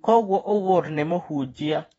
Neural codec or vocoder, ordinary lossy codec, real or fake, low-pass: vocoder, 48 kHz, 128 mel bands, Vocos; AAC, 24 kbps; fake; 19.8 kHz